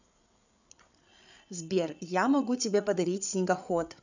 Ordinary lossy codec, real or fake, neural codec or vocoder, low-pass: none; fake; codec, 16 kHz, 8 kbps, FreqCodec, larger model; 7.2 kHz